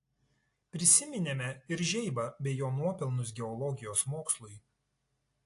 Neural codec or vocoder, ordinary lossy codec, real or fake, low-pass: none; AAC, 48 kbps; real; 10.8 kHz